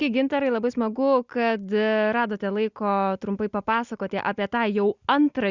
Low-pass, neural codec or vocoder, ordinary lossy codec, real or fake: 7.2 kHz; none; Opus, 64 kbps; real